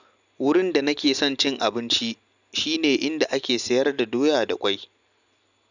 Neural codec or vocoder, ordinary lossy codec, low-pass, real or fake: none; none; 7.2 kHz; real